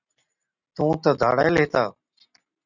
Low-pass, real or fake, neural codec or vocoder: 7.2 kHz; real; none